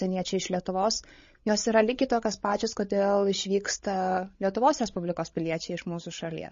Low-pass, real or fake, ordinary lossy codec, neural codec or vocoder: 7.2 kHz; fake; MP3, 32 kbps; codec, 16 kHz, 16 kbps, FreqCodec, larger model